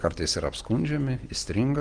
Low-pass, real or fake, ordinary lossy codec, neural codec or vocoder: 9.9 kHz; real; Opus, 24 kbps; none